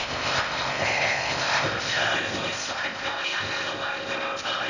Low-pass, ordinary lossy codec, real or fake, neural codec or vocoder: 7.2 kHz; none; fake; codec, 16 kHz in and 24 kHz out, 0.6 kbps, FocalCodec, streaming, 4096 codes